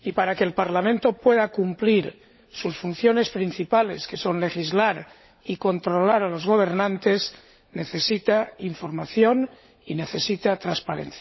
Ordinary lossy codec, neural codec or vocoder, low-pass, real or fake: MP3, 24 kbps; codec, 16 kHz, 16 kbps, FunCodec, trained on LibriTTS, 50 frames a second; 7.2 kHz; fake